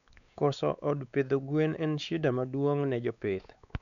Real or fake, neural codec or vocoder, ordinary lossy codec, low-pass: fake; codec, 16 kHz, 4 kbps, X-Codec, WavLM features, trained on Multilingual LibriSpeech; none; 7.2 kHz